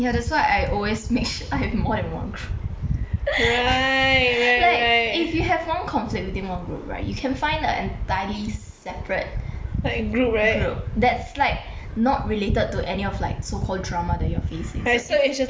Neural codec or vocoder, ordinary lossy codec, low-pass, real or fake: none; none; none; real